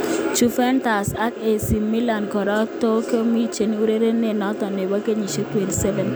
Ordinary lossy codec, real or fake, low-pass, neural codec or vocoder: none; real; none; none